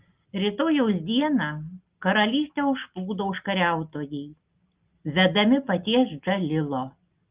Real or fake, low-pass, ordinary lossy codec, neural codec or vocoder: real; 3.6 kHz; Opus, 24 kbps; none